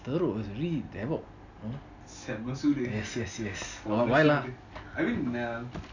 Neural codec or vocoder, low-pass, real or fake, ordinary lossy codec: none; 7.2 kHz; real; none